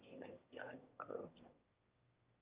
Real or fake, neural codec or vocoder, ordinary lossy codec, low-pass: fake; autoencoder, 22.05 kHz, a latent of 192 numbers a frame, VITS, trained on one speaker; Opus, 24 kbps; 3.6 kHz